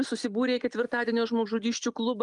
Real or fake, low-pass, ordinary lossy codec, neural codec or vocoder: real; 10.8 kHz; Opus, 32 kbps; none